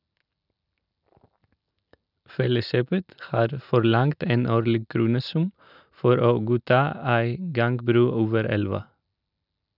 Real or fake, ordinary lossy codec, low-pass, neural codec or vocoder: real; none; 5.4 kHz; none